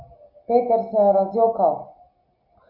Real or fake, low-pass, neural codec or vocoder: real; 5.4 kHz; none